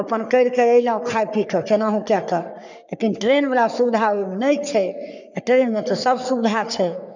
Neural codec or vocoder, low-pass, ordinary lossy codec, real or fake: codec, 44.1 kHz, 3.4 kbps, Pupu-Codec; 7.2 kHz; none; fake